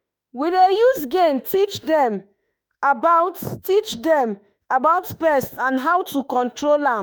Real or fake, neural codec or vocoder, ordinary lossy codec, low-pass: fake; autoencoder, 48 kHz, 32 numbers a frame, DAC-VAE, trained on Japanese speech; none; none